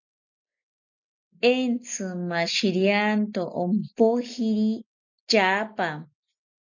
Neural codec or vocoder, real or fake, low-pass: none; real; 7.2 kHz